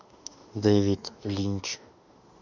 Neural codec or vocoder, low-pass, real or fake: autoencoder, 48 kHz, 32 numbers a frame, DAC-VAE, trained on Japanese speech; 7.2 kHz; fake